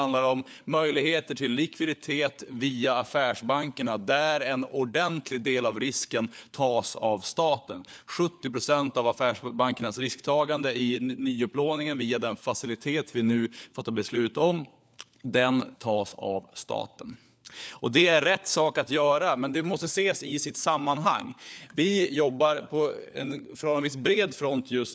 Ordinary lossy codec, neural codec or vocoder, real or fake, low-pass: none; codec, 16 kHz, 4 kbps, FunCodec, trained on LibriTTS, 50 frames a second; fake; none